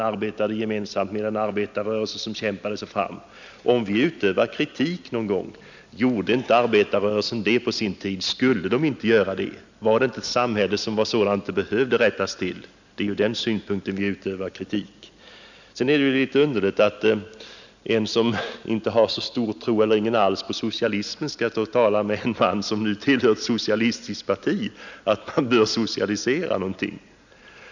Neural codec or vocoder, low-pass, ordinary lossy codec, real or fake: none; 7.2 kHz; none; real